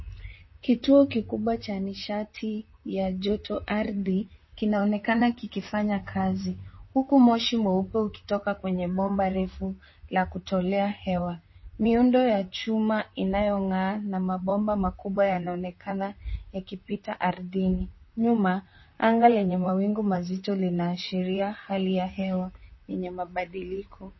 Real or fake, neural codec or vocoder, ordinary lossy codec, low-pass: fake; vocoder, 44.1 kHz, 128 mel bands, Pupu-Vocoder; MP3, 24 kbps; 7.2 kHz